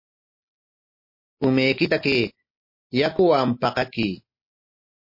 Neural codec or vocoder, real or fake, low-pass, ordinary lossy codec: none; real; 5.4 kHz; MP3, 32 kbps